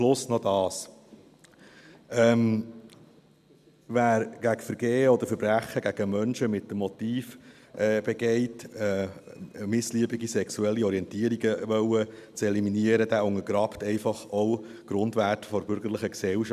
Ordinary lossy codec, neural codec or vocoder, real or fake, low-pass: none; none; real; 14.4 kHz